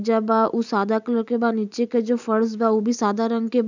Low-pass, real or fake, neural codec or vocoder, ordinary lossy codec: 7.2 kHz; real; none; none